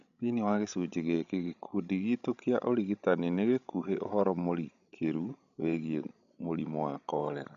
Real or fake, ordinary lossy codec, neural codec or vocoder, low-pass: fake; none; codec, 16 kHz, 16 kbps, FreqCodec, larger model; 7.2 kHz